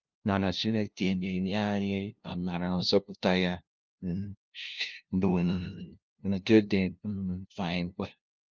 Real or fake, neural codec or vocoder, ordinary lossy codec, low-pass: fake; codec, 16 kHz, 0.5 kbps, FunCodec, trained on LibriTTS, 25 frames a second; Opus, 24 kbps; 7.2 kHz